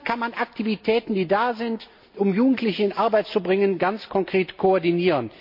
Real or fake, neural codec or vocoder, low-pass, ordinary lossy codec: real; none; 5.4 kHz; MP3, 32 kbps